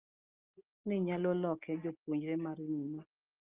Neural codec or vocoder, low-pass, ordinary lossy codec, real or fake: none; 3.6 kHz; Opus, 32 kbps; real